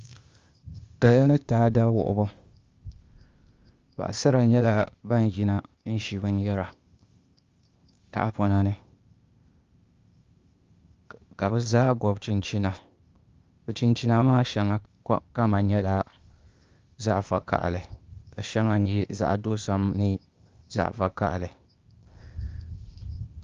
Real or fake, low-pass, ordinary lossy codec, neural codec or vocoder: fake; 7.2 kHz; Opus, 32 kbps; codec, 16 kHz, 0.8 kbps, ZipCodec